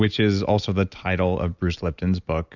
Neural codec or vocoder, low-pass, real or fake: none; 7.2 kHz; real